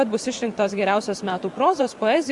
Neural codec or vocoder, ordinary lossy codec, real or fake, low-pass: vocoder, 44.1 kHz, 128 mel bands every 512 samples, BigVGAN v2; Opus, 64 kbps; fake; 10.8 kHz